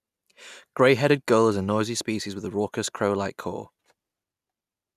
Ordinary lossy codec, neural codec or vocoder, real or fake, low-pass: none; none; real; 14.4 kHz